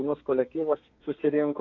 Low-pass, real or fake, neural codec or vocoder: 7.2 kHz; fake; codec, 32 kHz, 1.9 kbps, SNAC